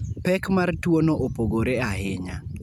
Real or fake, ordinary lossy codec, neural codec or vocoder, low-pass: real; none; none; 19.8 kHz